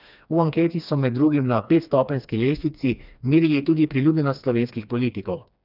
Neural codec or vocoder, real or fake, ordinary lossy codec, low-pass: codec, 16 kHz, 2 kbps, FreqCodec, smaller model; fake; none; 5.4 kHz